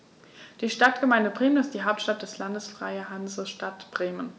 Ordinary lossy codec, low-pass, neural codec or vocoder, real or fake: none; none; none; real